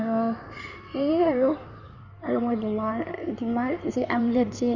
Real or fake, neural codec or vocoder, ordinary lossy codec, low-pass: fake; codec, 16 kHz in and 24 kHz out, 2.2 kbps, FireRedTTS-2 codec; none; 7.2 kHz